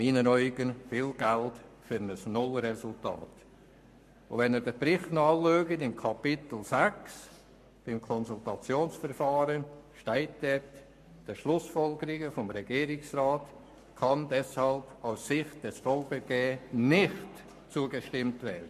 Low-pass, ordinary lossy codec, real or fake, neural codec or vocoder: 14.4 kHz; MP3, 64 kbps; fake; codec, 44.1 kHz, 7.8 kbps, Pupu-Codec